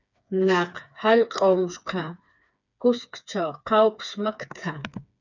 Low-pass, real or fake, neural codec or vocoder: 7.2 kHz; fake; codec, 16 kHz, 4 kbps, FreqCodec, smaller model